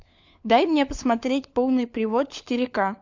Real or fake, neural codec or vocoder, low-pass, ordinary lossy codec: fake; codec, 16 kHz, 4 kbps, FunCodec, trained on LibriTTS, 50 frames a second; 7.2 kHz; MP3, 64 kbps